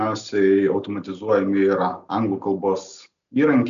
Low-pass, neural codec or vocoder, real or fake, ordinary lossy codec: 7.2 kHz; none; real; MP3, 96 kbps